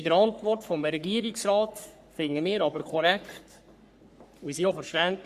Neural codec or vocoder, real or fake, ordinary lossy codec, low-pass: codec, 44.1 kHz, 3.4 kbps, Pupu-Codec; fake; Opus, 64 kbps; 14.4 kHz